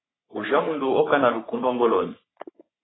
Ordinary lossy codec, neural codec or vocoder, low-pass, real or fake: AAC, 16 kbps; codec, 44.1 kHz, 3.4 kbps, Pupu-Codec; 7.2 kHz; fake